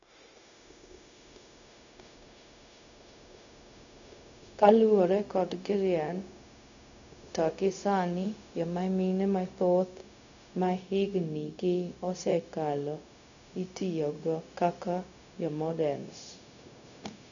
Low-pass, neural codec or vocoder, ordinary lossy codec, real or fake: 7.2 kHz; codec, 16 kHz, 0.4 kbps, LongCat-Audio-Codec; none; fake